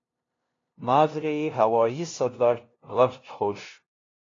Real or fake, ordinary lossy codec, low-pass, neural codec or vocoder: fake; AAC, 32 kbps; 7.2 kHz; codec, 16 kHz, 0.5 kbps, FunCodec, trained on LibriTTS, 25 frames a second